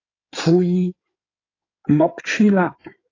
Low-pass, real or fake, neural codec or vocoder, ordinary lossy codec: 7.2 kHz; fake; codec, 16 kHz in and 24 kHz out, 2.2 kbps, FireRedTTS-2 codec; AAC, 32 kbps